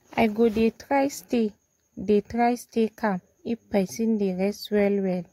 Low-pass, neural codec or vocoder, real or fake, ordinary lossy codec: 19.8 kHz; none; real; AAC, 48 kbps